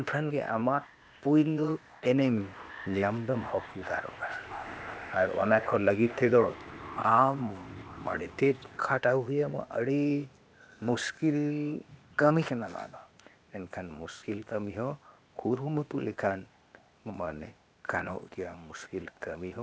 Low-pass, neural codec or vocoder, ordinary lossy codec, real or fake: none; codec, 16 kHz, 0.8 kbps, ZipCodec; none; fake